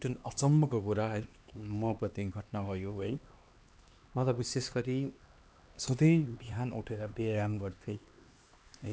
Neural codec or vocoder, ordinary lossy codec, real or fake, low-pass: codec, 16 kHz, 2 kbps, X-Codec, HuBERT features, trained on LibriSpeech; none; fake; none